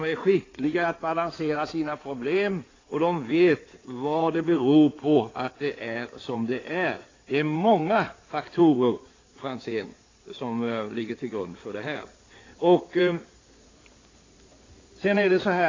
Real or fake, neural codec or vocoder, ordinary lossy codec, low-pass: fake; codec, 16 kHz in and 24 kHz out, 2.2 kbps, FireRedTTS-2 codec; AAC, 32 kbps; 7.2 kHz